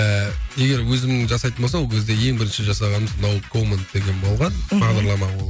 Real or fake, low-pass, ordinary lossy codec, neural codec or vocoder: real; none; none; none